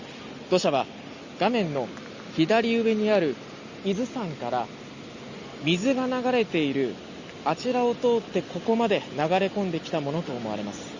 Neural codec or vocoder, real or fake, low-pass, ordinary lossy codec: none; real; 7.2 kHz; Opus, 64 kbps